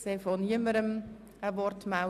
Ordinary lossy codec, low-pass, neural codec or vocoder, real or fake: none; 14.4 kHz; none; real